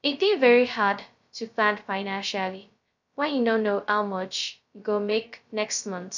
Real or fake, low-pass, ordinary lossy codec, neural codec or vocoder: fake; 7.2 kHz; none; codec, 16 kHz, 0.2 kbps, FocalCodec